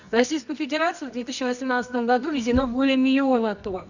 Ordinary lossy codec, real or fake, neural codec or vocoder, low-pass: none; fake; codec, 24 kHz, 0.9 kbps, WavTokenizer, medium music audio release; 7.2 kHz